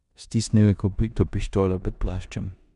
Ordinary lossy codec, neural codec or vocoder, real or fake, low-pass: none; codec, 16 kHz in and 24 kHz out, 0.9 kbps, LongCat-Audio-Codec, four codebook decoder; fake; 10.8 kHz